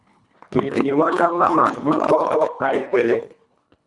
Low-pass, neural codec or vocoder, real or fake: 10.8 kHz; codec, 24 kHz, 1.5 kbps, HILCodec; fake